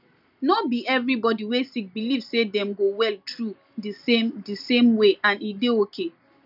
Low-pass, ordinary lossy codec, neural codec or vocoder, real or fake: 5.4 kHz; none; none; real